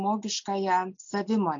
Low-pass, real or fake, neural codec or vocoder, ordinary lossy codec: 7.2 kHz; real; none; MP3, 48 kbps